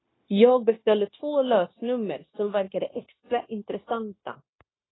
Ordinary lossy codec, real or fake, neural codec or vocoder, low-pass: AAC, 16 kbps; fake; codec, 16 kHz, 0.9 kbps, LongCat-Audio-Codec; 7.2 kHz